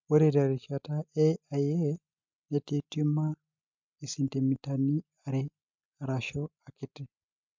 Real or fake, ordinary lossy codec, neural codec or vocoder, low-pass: real; MP3, 64 kbps; none; 7.2 kHz